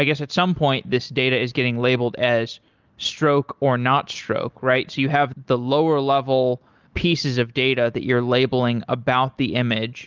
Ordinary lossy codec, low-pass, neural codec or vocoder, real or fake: Opus, 24 kbps; 7.2 kHz; none; real